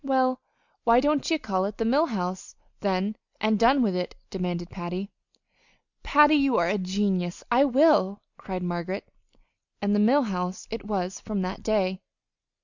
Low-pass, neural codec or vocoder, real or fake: 7.2 kHz; none; real